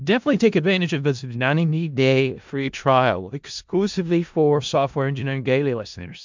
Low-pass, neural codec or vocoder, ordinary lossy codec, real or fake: 7.2 kHz; codec, 16 kHz in and 24 kHz out, 0.4 kbps, LongCat-Audio-Codec, four codebook decoder; MP3, 64 kbps; fake